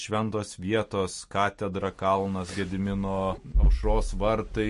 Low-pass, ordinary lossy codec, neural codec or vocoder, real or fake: 14.4 kHz; MP3, 48 kbps; none; real